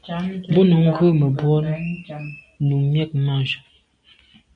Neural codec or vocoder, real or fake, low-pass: none; real; 9.9 kHz